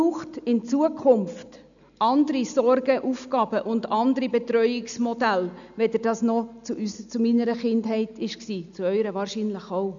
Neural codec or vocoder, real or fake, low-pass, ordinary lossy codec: none; real; 7.2 kHz; none